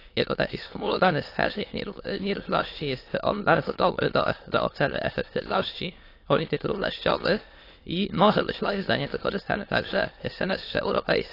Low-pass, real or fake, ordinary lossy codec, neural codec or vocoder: 5.4 kHz; fake; AAC, 32 kbps; autoencoder, 22.05 kHz, a latent of 192 numbers a frame, VITS, trained on many speakers